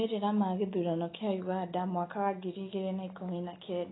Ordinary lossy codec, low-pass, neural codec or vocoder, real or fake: AAC, 16 kbps; 7.2 kHz; none; real